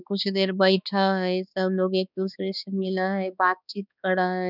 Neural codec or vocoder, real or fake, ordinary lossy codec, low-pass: codec, 16 kHz, 2 kbps, X-Codec, HuBERT features, trained on balanced general audio; fake; none; 5.4 kHz